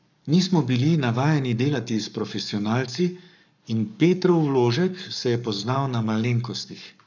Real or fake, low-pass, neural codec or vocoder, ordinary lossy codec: fake; 7.2 kHz; codec, 44.1 kHz, 7.8 kbps, Pupu-Codec; none